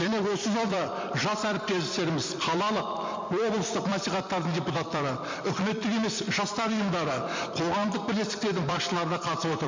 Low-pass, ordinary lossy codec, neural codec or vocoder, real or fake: 7.2 kHz; MP3, 48 kbps; vocoder, 44.1 kHz, 128 mel bands every 256 samples, BigVGAN v2; fake